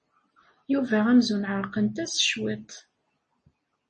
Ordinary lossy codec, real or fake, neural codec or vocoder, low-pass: MP3, 32 kbps; real; none; 9.9 kHz